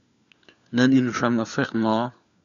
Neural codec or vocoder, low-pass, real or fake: codec, 16 kHz, 4 kbps, FunCodec, trained on LibriTTS, 50 frames a second; 7.2 kHz; fake